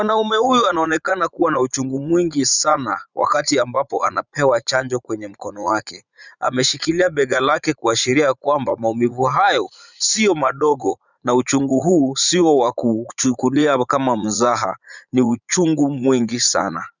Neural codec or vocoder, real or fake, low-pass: vocoder, 44.1 kHz, 80 mel bands, Vocos; fake; 7.2 kHz